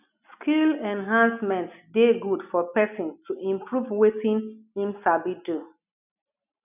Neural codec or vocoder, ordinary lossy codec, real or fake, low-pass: none; none; real; 3.6 kHz